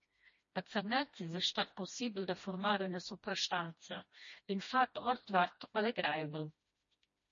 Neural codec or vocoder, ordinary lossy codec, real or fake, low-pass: codec, 16 kHz, 1 kbps, FreqCodec, smaller model; MP3, 32 kbps; fake; 7.2 kHz